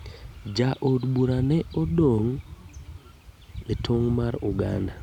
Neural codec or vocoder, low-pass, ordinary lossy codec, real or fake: none; 19.8 kHz; none; real